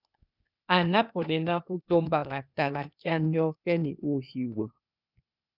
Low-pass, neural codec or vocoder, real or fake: 5.4 kHz; codec, 16 kHz, 0.8 kbps, ZipCodec; fake